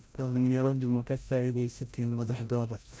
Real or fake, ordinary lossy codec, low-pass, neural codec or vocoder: fake; none; none; codec, 16 kHz, 0.5 kbps, FreqCodec, larger model